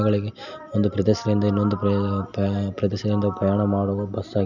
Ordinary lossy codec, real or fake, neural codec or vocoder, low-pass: none; real; none; 7.2 kHz